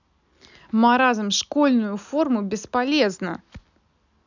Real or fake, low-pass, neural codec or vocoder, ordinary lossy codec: real; 7.2 kHz; none; none